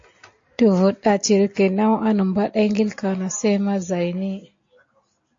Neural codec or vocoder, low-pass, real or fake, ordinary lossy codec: none; 7.2 kHz; real; AAC, 48 kbps